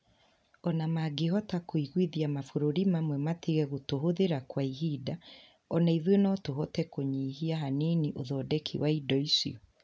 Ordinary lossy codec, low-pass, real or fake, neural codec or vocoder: none; none; real; none